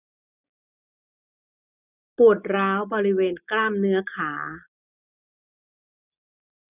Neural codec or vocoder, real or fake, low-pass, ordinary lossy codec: none; real; 3.6 kHz; none